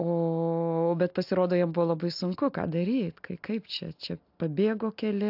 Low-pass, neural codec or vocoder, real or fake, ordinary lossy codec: 5.4 kHz; none; real; AAC, 48 kbps